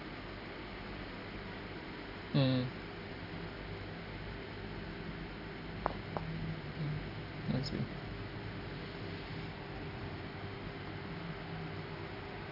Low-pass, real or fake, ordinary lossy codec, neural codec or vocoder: 5.4 kHz; real; none; none